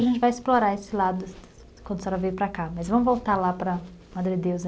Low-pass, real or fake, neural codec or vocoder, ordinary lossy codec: none; real; none; none